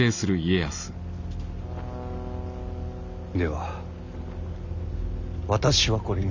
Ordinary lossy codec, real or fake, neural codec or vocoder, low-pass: none; real; none; 7.2 kHz